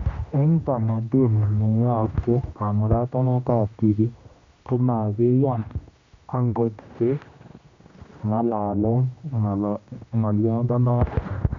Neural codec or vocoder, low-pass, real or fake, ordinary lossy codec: codec, 16 kHz, 1 kbps, X-Codec, HuBERT features, trained on general audio; 7.2 kHz; fake; MP3, 64 kbps